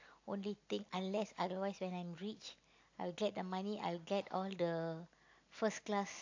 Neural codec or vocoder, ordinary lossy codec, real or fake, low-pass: none; AAC, 48 kbps; real; 7.2 kHz